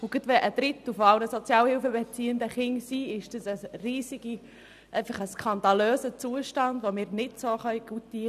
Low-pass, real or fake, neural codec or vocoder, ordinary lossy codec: 14.4 kHz; real; none; none